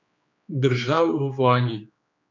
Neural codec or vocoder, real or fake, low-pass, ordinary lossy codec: codec, 16 kHz, 2 kbps, X-Codec, HuBERT features, trained on general audio; fake; 7.2 kHz; MP3, 64 kbps